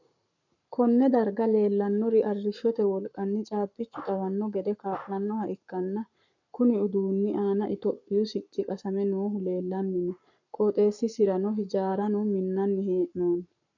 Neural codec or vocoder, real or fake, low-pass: codec, 44.1 kHz, 7.8 kbps, Pupu-Codec; fake; 7.2 kHz